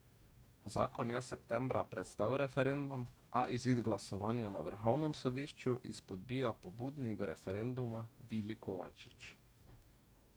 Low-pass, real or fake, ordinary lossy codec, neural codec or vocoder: none; fake; none; codec, 44.1 kHz, 2.6 kbps, DAC